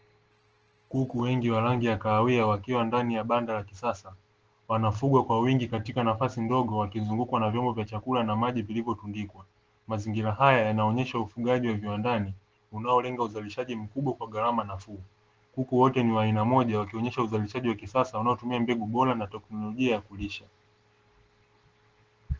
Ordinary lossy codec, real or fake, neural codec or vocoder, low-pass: Opus, 16 kbps; real; none; 7.2 kHz